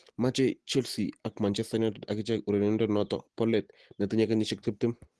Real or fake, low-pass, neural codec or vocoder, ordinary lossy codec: real; 10.8 kHz; none; Opus, 16 kbps